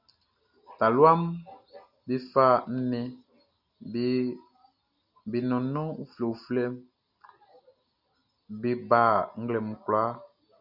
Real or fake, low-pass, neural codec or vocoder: real; 5.4 kHz; none